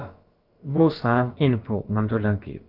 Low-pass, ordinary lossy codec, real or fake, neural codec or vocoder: 5.4 kHz; Opus, 32 kbps; fake; codec, 16 kHz, about 1 kbps, DyCAST, with the encoder's durations